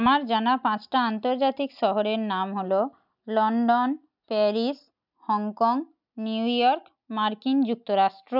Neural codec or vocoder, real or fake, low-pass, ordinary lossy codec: none; real; 5.4 kHz; none